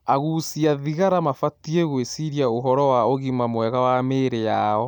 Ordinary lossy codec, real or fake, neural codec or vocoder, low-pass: MP3, 96 kbps; real; none; 19.8 kHz